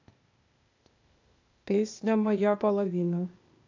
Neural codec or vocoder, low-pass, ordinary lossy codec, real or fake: codec, 16 kHz, 0.8 kbps, ZipCodec; 7.2 kHz; AAC, 48 kbps; fake